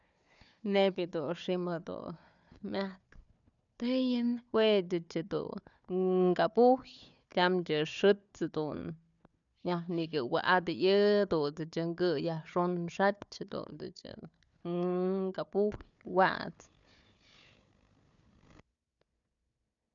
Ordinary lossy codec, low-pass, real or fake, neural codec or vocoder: none; 7.2 kHz; fake; codec, 16 kHz, 4 kbps, FunCodec, trained on Chinese and English, 50 frames a second